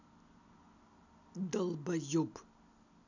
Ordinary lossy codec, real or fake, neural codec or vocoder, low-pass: none; real; none; 7.2 kHz